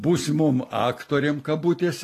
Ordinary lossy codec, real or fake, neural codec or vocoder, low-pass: AAC, 48 kbps; real; none; 14.4 kHz